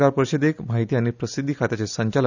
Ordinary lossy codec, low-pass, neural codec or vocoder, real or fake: none; 7.2 kHz; none; real